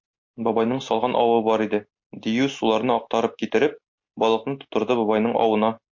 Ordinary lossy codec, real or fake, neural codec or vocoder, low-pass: MP3, 48 kbps; real; none; 7.2 kHz